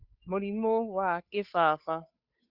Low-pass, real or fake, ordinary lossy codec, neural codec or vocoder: 5.4 kHz; fake; Opus, 64 kbps; codec, 16 kHz, 8 kbps, FunCodec, trained on Chinese and English, 25 frames a second